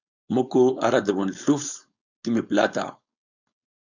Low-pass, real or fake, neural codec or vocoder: 7.2 kHz; fake; codec, 16 kHz, 4.8 kbps, FACodec